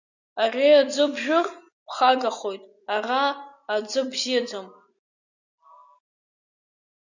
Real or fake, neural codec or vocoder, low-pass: real; none; 7.2 kHz